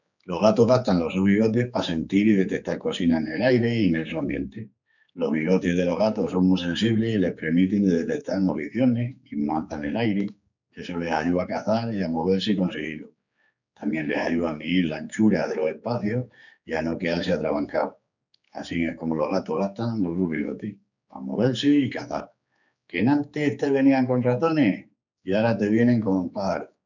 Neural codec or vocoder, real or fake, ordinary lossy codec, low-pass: codec, 16 kHz, 4 kbps, X-Codec, HuBERT features, trained on general audio; fake; none; 7.2 kHz